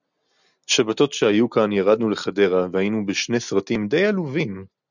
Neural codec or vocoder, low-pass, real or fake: none; 7.2 kHz; real